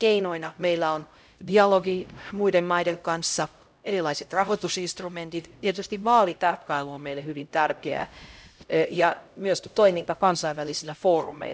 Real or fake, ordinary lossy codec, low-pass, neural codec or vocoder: fake; none; none; codec, 16 kHz, 0.5 kbps, X-Codec, HuBERT features, trained on LibriSpeech